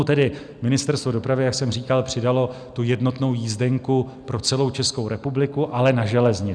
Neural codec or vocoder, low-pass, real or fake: none; 9.9 kHz; real